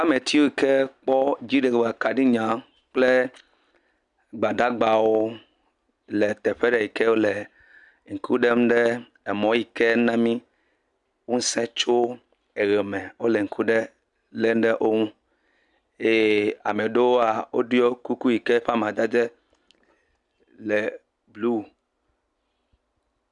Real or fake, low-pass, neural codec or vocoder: real; 10.8 kHz; none